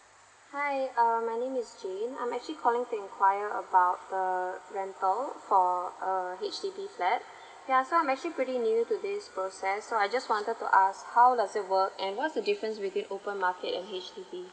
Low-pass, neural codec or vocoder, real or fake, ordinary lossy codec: none; none; real; none